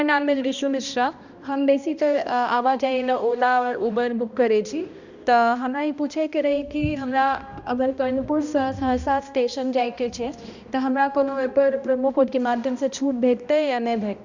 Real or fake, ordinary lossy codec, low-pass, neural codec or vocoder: fake; Opus, 64 kbps; 7.2 kHz; codec, 16 kHz, 1 kbps, X-Codec, HuBERT features, trained on balanced general audio